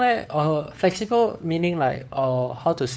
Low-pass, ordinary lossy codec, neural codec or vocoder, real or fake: none; none; codec, 16 kHz, 4 kbps, FunCodec, trained on LibriTTS, 50 frames a second; fake